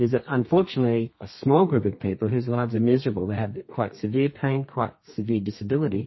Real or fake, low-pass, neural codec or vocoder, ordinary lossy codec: fake; 7.2 kHz; codec, 32 kHz, 1.9 kbps, SNAC; MP3, 24 kbps